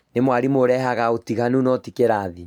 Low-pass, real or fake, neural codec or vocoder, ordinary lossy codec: 19.8 kHz; real; none; none